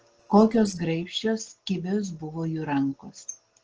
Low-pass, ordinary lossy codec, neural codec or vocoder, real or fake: 7.2 kHz; Opus, 16 kbps; none; real